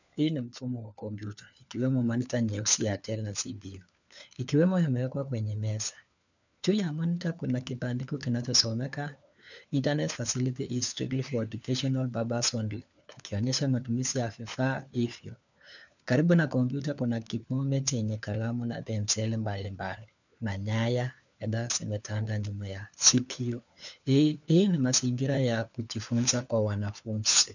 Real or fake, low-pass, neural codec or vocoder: fake; 7.2 kHz; codec, 16 kHz, 4 kbps, FunCodec, trained on LibriTTS, 50 frames a second